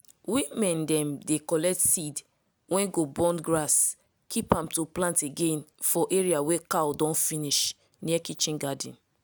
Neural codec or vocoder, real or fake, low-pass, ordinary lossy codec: none; real; none; none